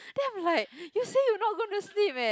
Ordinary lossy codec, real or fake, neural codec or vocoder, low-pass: none; real; none; none